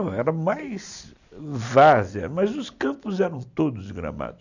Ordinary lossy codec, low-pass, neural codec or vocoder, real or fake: none; 7.2 kHz; none; real